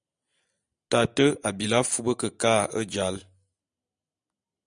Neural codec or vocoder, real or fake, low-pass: none; real; 9.9 kHz